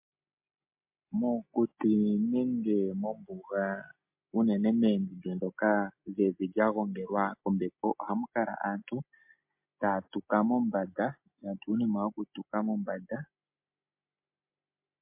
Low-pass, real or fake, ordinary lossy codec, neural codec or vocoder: 3.6 kHz; real; MP3, 32 kbps; none